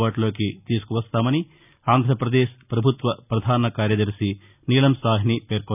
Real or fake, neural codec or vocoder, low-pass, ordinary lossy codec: real; none; 3.6 kHz; none